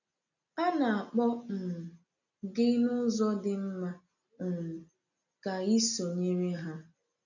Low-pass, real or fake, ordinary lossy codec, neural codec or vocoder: 7.2 kHz; real; none; none